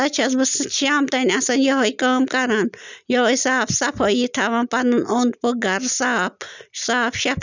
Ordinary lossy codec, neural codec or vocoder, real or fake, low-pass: none; none; real; 7.2 kHz